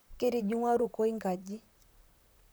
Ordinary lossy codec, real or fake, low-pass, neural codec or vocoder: none; fake; none; vocoder, 44.1 kHz, 128 mel bands, Pupu-Vocoder